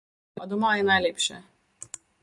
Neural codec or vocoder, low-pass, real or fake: none; 10.8 kHz; real